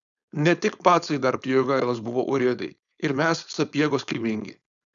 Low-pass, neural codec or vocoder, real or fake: 7.2 kHz; codec, 16 kHz, 4.8 kbps, FACodec; fake